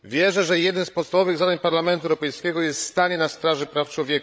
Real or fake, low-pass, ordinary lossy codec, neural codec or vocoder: fake; none; none; codec, 16 kHz, 16 kbps, FreqCodec, larger model